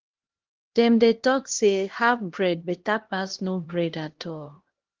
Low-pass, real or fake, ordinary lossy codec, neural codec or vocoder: 7.2 kHz; fake; Opus, 16 kbps; codec, 16 kHz, 1 kbps, X-Codec, HuBERT features, trained on LibriSpeech